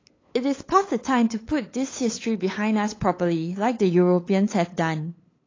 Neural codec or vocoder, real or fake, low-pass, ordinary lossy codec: codec, 16 kHz, 8 kbps, FunCodec, trained on LibriTTS, 25 frames a second; fake; 7.2 kHz; AAC, 32 kbps